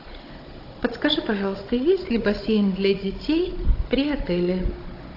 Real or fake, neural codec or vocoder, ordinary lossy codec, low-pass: fake; codec, 16 kHz, 16 kbps, FunCodec, trained on Chinese and English, 50 frames a second; AAC, 32 kbps; 5.4 kHz